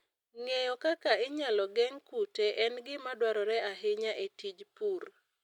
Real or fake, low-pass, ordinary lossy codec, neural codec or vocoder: real; 19.8 kHz; none; none